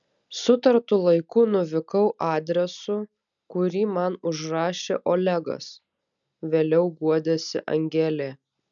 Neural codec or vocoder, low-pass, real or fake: none; 7.2 kHz; real